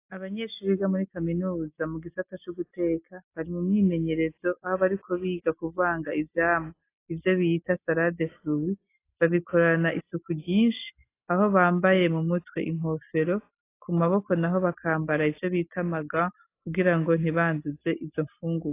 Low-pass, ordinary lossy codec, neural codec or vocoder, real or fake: 3.6 kHz; AAC, 24 kbps; none; real